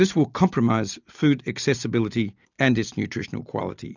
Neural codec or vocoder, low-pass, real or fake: none; 7.2 kHz; real